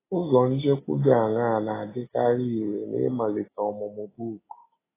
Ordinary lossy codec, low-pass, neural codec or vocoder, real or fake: AAC, 16 kbps; 3.6 kHz; none; real